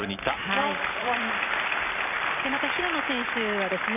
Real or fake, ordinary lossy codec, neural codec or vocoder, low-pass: fake; none; vocoder, 44.1 kHz, 128 mel bands every 256 samples, BigVGAN v2; 3.6 kHz